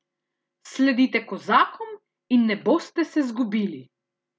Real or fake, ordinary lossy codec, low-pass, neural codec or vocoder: real; none; none; none